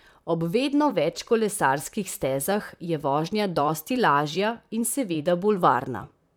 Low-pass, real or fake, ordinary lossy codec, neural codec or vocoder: none; fake; none; vocoder, 44.1 kHz, 128 mel bands, Pupu-Vocoder